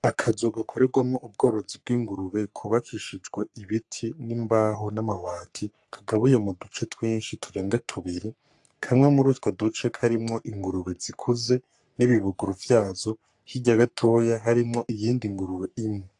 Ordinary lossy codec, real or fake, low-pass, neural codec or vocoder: AAC, 64 kbps; fake; 10.8 kHz; codec, 44.1 kHz, 3.4 kbps, Pupu-Codec